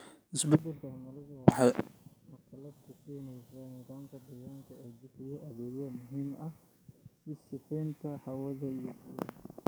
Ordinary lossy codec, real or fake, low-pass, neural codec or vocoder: none; fake; none; codec, 44.1 kHz, 7.8 kbps, DAC